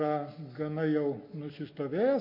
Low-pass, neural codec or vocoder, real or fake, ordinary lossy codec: 5.4 kHz; none; real; MP3, 32 kbps